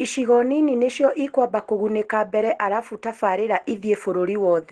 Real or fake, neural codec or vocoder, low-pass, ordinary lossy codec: real; none; 10.8 kHz; Opus, 16 kbps